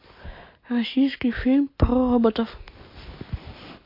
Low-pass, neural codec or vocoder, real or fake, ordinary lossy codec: 5.4 kHz; none; real; MP3, 32 kbps